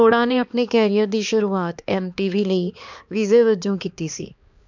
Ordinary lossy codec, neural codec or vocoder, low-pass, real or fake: none; codec, 16 kHz, 2 kbps, X-Codec, HuBERT features, trained on balanced general audio; 7.2 kHz; fake